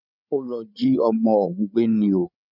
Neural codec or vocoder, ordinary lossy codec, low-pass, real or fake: codec, 16 kHz, 8 kbps, FreqCodec, larger model; none; 5.4 kHz; fake